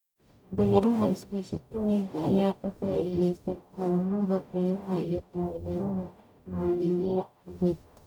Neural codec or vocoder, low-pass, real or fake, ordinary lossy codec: codec, 44.1 kHz, 0.9 kbps, DAC; 19.8 kHz; fake; none